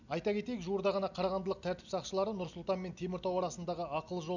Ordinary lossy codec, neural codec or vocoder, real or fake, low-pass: none; none; real; 7.2 kHz